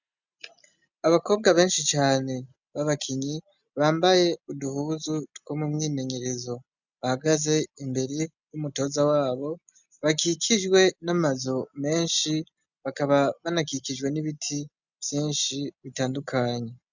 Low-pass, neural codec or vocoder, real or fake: 7.2 kHz; none; real